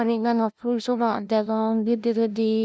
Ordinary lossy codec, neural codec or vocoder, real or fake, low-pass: none; codec, 16 kHz, 0.5 kbps, FunCodec, trained on LibriTTS, 25 frames a second; fake; none